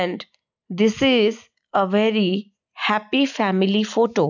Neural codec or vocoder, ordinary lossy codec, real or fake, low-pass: none; none; real; 7.2 kHz